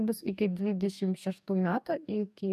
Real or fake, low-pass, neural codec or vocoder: fake; 14.4 kHz; codec, 44.1 kHz, 2.6 kbps, DAC